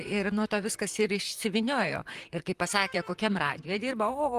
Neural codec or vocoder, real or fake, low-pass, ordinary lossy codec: vocoder, 44.1 kHz, 128 mel bands, Pupu-Vocoder; fake; 14.4 kHz; Opus, 24 kbps